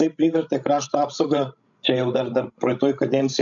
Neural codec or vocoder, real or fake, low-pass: codec, 16 kHz, 16 kbps, FreqCodec, larger model; fake; 7.2 kHz